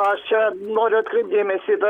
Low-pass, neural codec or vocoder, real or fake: 19.8 kHz; vocoder, 44.1 kHz, 128 mel bands, Pupu-Vocoder; fake